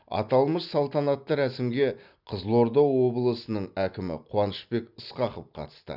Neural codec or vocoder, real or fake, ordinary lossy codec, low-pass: none; real; none; 5.4 kHz